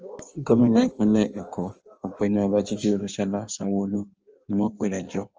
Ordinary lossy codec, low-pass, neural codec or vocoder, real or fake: Opus, 24 kbps; 7.2 kHz; codec, 16 kHz in and 24 kHz out, 1.1 kbps, FireRedTTS-2 codec; fake